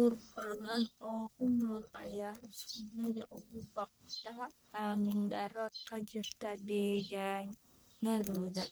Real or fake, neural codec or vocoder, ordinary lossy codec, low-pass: fake; codec, 44.1 kHz, 1.7 kbps, Pupu-Codec; none; none